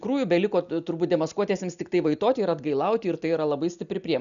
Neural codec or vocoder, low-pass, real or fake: none; 7.2 kHz; real